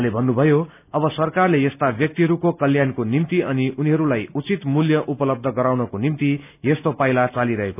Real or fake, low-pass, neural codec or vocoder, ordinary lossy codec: real; 3.6 kHz; none; none